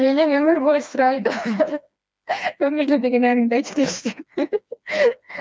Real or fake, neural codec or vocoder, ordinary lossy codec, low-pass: fake; codec, 16 kHz, 2 kbps, FreqCodec, smaller model; none; none